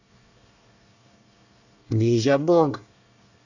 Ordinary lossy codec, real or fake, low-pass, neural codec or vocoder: none; fake; 7.2 kHz; codec, 24 kHz, 1 kbps, SNAC